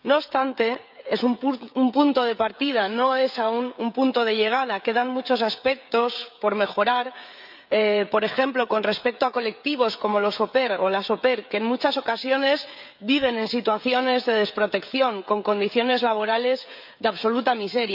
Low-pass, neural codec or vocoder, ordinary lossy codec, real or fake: 5.4 kHz; codec, 16 kHz, 16 kbps, FreqCodec, smaller model; none; fake